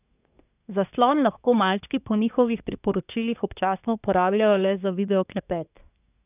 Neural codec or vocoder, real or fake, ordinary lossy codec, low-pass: codec, 24 kHz, 1 kbps, SNAC; fake; none; 3.6 kHz